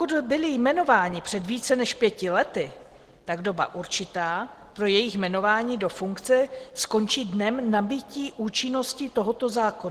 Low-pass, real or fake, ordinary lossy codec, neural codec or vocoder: 14.4 kHz; real; Opus, 16 kbps; none